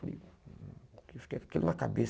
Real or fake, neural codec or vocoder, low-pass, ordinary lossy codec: real; none; none; none